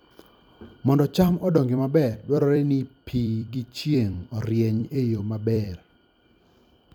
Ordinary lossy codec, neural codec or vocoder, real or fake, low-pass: none; vocoder, 44.1 kHz, 128 mel bands every 256 samples, BigVGAN v2; fake; 19.8 kHz